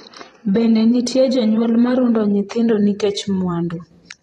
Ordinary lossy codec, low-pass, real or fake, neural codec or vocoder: AAC, 32 kbps; 19.8 kHz; fake; vocoder, 44.1 kHz, 128 mel bands every 512 samples, BigVGAN v2